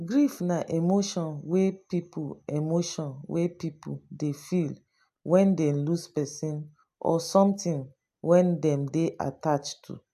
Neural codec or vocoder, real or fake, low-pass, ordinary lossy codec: none; real; 14.4 kHz; AAC, 96 kbps